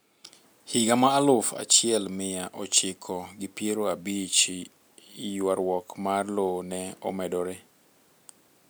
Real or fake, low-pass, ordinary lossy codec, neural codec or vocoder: real; none; none; none